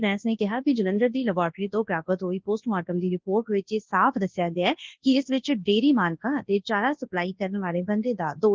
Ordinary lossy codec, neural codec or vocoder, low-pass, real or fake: Opus, 16 kbps; codec, 24 kHz, 0.9 kbps, WavTokenizer, large speech release; 7.2 kHz; fake